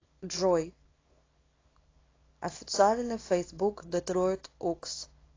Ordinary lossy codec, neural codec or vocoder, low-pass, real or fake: AAC, 32 kbps; codec, 24 kHz, 0.9 kbps, WavTokenizer, small release; 7.2 kHz; fake